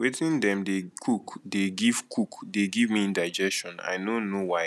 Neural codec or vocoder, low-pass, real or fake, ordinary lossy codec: none; none; real; none